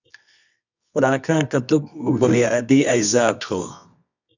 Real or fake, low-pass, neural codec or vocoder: fake; 7.2 kHz; codec, 24 kHz, 0.9 kbps, WavTokenizer, medium music audio release